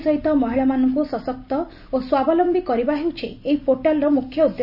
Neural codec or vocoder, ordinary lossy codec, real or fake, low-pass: none; none; real; 5.4 kHz